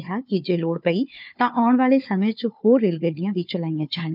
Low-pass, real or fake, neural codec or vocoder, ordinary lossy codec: 5.4 kHz; fake; codec, 16 kHz, 4 kbps, FunCodec, trained on Chinese and English, 50 frames a second; none